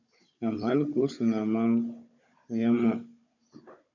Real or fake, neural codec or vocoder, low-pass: fake; codec, 16 kHz, 16 kbps, FunCodec, trained on Chinese and English, 50 frames a second; 7.2 kHz